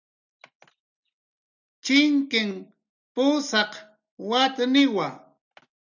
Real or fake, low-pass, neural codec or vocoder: real; 7.2 kHz; none